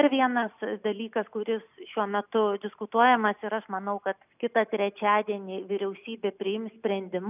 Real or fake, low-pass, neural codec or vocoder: real; 3.6 kHz; none